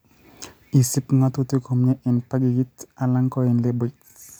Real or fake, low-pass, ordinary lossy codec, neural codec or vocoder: real; none; none; none